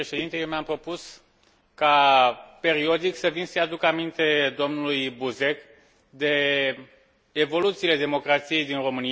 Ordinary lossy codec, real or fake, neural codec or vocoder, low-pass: none; real; none; none